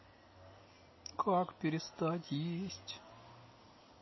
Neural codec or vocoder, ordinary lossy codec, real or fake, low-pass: none; MP3, 24 kbps; real; 7.2 kHz